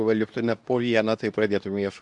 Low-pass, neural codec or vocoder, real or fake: 10.8 kHz; codec, 24 kHz, 0.9 kbps, WavTokenizer, medium speech release version 2; fake